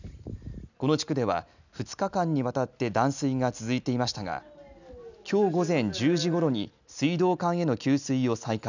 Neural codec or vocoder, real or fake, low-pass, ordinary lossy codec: none; real; 7.2 kHz; none